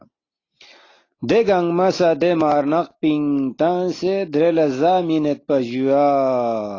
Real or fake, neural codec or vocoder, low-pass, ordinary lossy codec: real; none; 7.2 kHz; AAC, 32 kbps